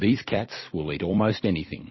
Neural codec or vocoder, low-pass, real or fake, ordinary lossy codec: vocoder, 44.1 kHz, 128 mel bands every 256 samples, BigVGAN v2; 7.2 kHz; fake; MP3, 24 kbps